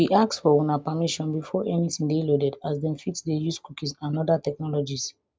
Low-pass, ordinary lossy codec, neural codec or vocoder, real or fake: none; none; none; real